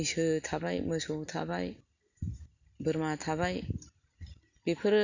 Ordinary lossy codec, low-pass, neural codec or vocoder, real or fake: Opus, 64 kbps; 7.2 kHz; none; real